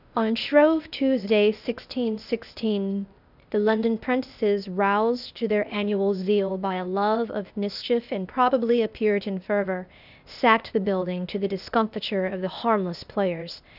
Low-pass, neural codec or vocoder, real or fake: 5.4 kHz; codec, 16 kHz, 0.8 kbps, ZipCodec; fake